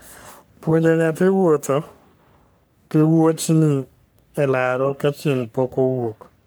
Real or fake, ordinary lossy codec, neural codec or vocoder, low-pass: fake; none; codec, 44.1 kHz, 3.4 kbps, Pupu-Codec; none